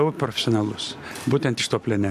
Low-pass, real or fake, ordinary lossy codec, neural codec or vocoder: 14.4 kHz; real; MP3, 64 kbps; none